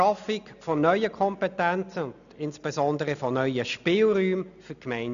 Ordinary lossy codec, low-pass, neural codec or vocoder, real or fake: none; 7.2 kHz; none; real